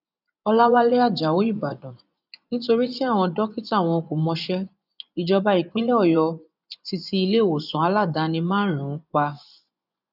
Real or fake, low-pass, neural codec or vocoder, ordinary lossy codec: fake; 5.4 kHz; vocoder, 24 kHz, 100 mel bands, Vocos; none